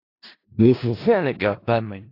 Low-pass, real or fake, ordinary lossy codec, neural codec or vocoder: 5.4 kHz; fake; AAC, 32 kbps; codec, 16 kHz in and 24 kHz out, 0.4 kbps, LongCat-Audio-Codec, four codebook decoder